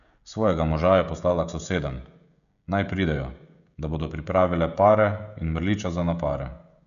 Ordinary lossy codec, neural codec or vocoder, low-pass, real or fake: none; codec, 16 kHz, 16 kbps, FreqCodec, smaller model; 7.2 kHz; fake